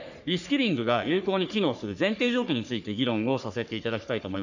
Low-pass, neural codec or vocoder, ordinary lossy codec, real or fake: 7.2 kHz; codec, 44.1 kHz, 3.4 kbps, Pupu-Codec; none; fake